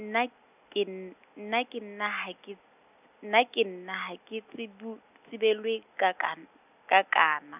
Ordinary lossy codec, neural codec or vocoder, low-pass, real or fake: none; none; 3.6 kHz; real